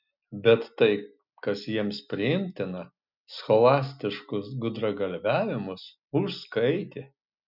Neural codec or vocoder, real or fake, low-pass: none; real; 5.4 kHz